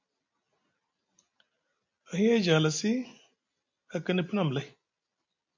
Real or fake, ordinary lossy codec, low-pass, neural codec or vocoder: real; MP3, 48 kbps; 7.2 kHz; none